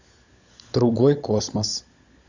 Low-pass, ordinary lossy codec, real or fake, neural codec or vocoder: 7.2 kHz; Opus, 64 kbps; fake; codec, 16 kHz, 16 kbps, FunCodec, trained on LibriTTS, 50 frames a second